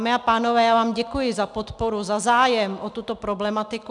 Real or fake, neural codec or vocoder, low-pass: real; none; 10.8 kHz